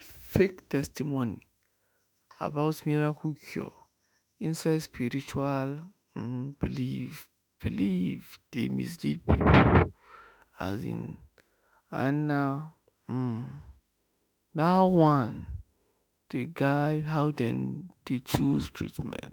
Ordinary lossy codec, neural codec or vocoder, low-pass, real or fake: none; autoencoder, 48 kHz, 32 numbers a frame, DAC-VAE, trained on Japanese speech; none; fake